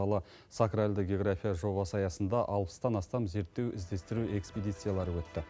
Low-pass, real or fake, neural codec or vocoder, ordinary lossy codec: none; real; none; none